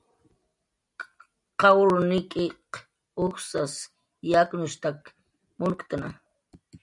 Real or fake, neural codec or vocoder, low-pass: real; none; 10.8 kHz